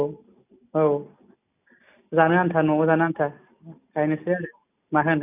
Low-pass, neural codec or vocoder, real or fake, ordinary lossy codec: 3.6 kHz; none; real; none